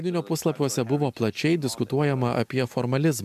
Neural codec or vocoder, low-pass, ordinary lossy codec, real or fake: none; 14.4 kHz; MP3, 96 kbps; real